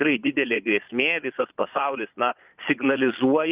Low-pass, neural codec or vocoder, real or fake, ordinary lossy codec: 3.6 kHz; vocoder, 22.05 kHz, 80 mel bands, Vocos; fake; Opus, 24 kbps